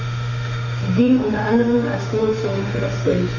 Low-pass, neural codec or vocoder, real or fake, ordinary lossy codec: 7.2 kHz; autoencoder, 48 kHz, 32 numbers a frame, DAC-VAE, trained on Japanese speech; fake; none